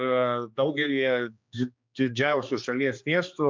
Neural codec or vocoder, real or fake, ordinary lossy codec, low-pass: codec, 16 kHz, 2 kbps, X-Codec, HuBERT features, trained on general audio; fake; MP3, 64 kbps; 7.2 kHz